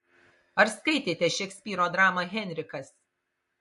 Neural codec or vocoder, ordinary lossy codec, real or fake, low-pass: none; MP3, 48 kbps; real; 14.4 kHz